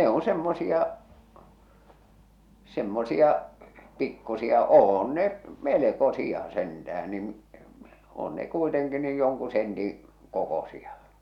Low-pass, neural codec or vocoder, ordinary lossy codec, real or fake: 19.8 kHz; none; none; real